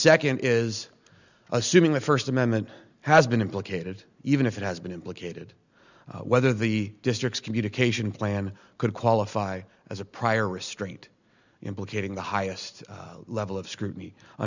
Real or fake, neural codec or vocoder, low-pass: real; none; 7.2 kHz